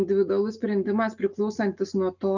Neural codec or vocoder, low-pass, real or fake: none; 7.2 kHz; real